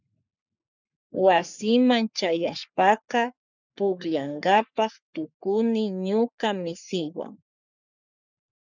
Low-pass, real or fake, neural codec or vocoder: 7.2 kHz; fake; codec, 44.1 kHz, 3.4 kbps, Pupu-Codec